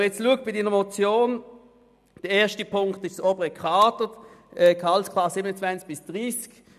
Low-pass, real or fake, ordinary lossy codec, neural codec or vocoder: 14.4 kHz; real; none; none